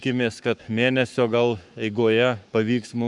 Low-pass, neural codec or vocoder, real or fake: 10.8 kHz; codec, 44.1 kHz, 7.8 kbps, Pupu-Codec; fake